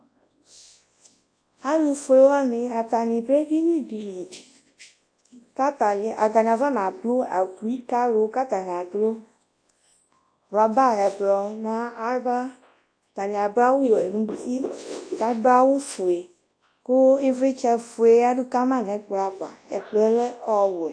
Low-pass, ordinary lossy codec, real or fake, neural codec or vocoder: 9.9 kHz; AAC, 48 kbps; fake; codec, 24 kHz, 0.9 kbps, WavTokenizer, large speech release